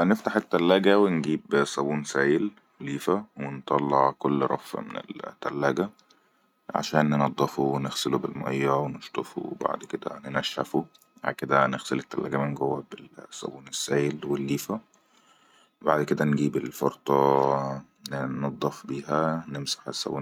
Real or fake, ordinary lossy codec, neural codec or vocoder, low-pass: real; none; none; 19.8 kHz